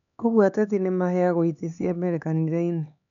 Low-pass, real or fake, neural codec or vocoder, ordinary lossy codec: 7.2 kHz; fake; codec, 16 kHz, 4 kbps, X-Codec, HuBERT features, trained on LibriSpeech; none